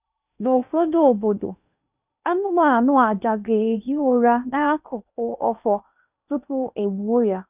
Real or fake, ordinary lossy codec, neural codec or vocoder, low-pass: fake; none; codec, 16 kHz in and 24 kHz out, 0.8 kbps, FocalCodec, streaming, 65536 codes; 3.6 kHz